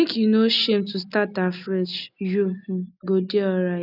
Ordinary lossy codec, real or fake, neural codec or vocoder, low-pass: none; real; none; 5.4 kHz